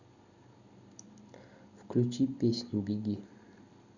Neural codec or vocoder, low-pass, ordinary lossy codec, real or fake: none; 7.2 kHz; none; real